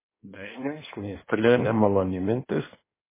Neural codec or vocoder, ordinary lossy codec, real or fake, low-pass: codec, 16 kHz in and 24 kHz out, 1.1 kbps, FireRedTTS-2 codec; MP3, 16 kbps; fake; 3.6 kHz